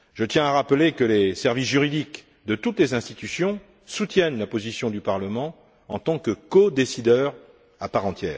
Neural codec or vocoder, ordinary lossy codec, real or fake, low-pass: none; none; real; none